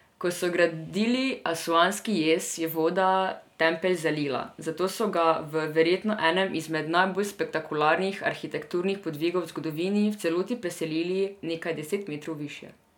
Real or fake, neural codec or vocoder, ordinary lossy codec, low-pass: real; none; none; 19.8 kHz